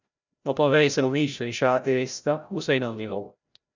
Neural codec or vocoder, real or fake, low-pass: codec, 16 kHz, 0.5 kbps, FreqCodec, larger model; fake; 7.2 kHz